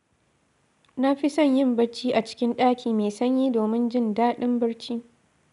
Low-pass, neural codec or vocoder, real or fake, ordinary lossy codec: 10.8 kHz; none; real; none